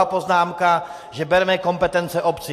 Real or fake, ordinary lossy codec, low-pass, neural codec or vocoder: real; AAC, 64 kbps; 14.4 kHz; none